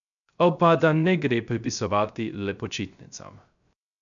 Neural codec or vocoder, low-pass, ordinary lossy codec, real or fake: codec, 16 kHz, 0.3 kbps, FocalCodec; 7.2 kHz; none; fake